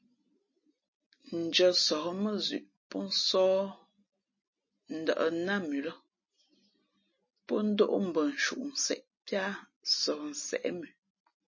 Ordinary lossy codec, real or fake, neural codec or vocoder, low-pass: MP3, 32 kbps; real; none; 7.2 kHz